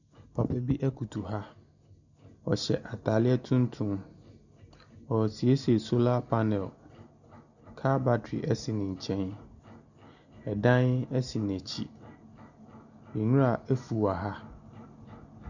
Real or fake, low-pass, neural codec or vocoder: real; 7.2 kHz; none